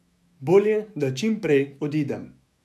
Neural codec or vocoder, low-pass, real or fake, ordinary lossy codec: autoencoder, 48 kHz, 128 numbers a frame, DAC-VAE, trained on Japanese speech; 14.4 kHz; fake; none